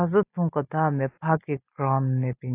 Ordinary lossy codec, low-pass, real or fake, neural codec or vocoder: AAC, 24 kbps; 3.6 kHz; real; none